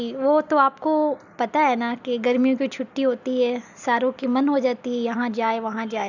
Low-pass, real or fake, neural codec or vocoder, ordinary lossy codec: 7.2 kHz; real; none; none